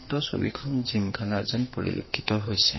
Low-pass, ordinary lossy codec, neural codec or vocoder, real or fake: 7.2 kHz; MP3, 24 kbps; codec, 44.1 kHz, 2.6 kbps, SNAC; fake